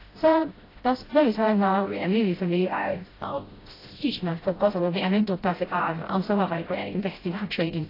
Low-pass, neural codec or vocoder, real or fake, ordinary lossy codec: 5.4 kHz; codec, 16 kHz, 0.5 kbps, FreqCodec, smaller model; fake; AAC, 24 kbps